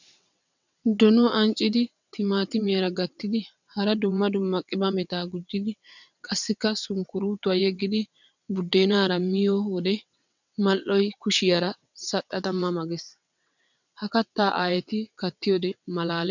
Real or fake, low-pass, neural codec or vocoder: fake; 7.2 kHz; vocoder, 22.05 kHz, 80 mel bands, WaveNeXt